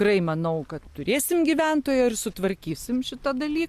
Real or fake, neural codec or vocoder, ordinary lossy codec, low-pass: real; none; Opus, 64 kbps; 14.4 kHz